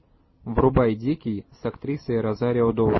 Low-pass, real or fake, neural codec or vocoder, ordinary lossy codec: 7.2 kHz; real; none; MP3, 24 kbps